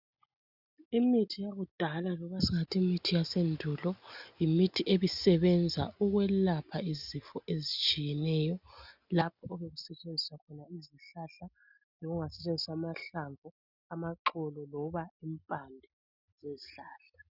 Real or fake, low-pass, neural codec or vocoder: real; 5.4 kHz; none